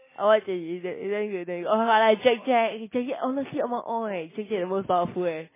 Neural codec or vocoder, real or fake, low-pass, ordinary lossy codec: none; real; 3.6 kHz; MP3, 16 kbps